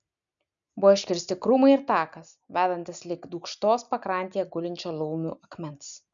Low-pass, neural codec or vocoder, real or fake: 7.2 kHz; none; real